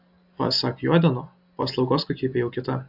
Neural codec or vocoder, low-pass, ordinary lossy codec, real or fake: none; 5.4 kHz; AAC, 48 kbps; real